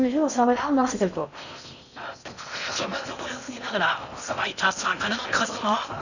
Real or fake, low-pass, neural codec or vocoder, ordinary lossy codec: fake; 7.2 kHz; codec, 16 kHz in and 24 kHz out, 0.6 kbps, FocalCodec, streaming, 4096 codes; none